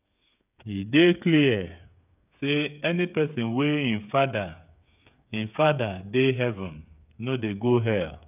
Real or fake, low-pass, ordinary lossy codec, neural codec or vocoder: fake; 3.6 kHz; none; codec, 16 kHz, 8 kbps, FreqCodec, smaller model